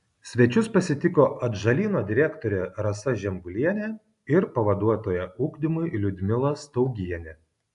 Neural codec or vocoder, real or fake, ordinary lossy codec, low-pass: none; real; AAC, 96 kbps; 10.8 kHz